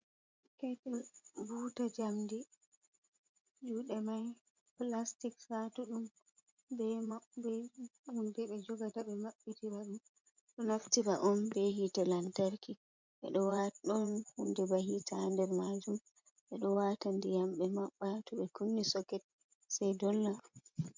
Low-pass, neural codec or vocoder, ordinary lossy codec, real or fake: 7.2 kHz; vocoder, 24 kHz, 100 mel bands, Vocos; MP3, 64 kbps; fake